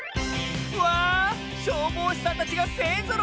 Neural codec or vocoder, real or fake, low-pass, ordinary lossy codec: none; real; none; none